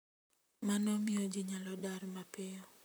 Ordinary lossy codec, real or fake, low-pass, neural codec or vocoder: none; fake; none; vocoder, 44.1 kHz, 128 mel bands, Pupu-Vocoder